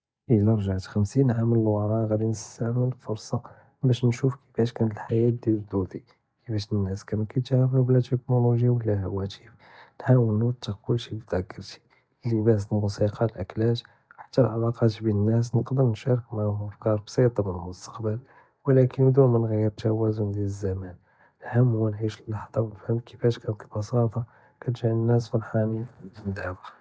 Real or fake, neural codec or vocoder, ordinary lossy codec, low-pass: real; none; none; none